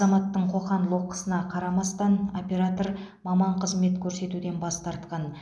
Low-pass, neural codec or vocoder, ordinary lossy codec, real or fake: none; none; none; real